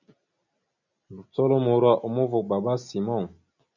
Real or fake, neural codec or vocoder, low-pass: real; none; 7.2 kHz